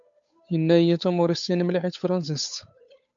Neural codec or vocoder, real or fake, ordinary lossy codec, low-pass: codec, 16 kHz, 8 kbps, FunCodec, trained on Chinese and English, 25 frames a second; fake; MP3, 64 kbps; 7.2 kHz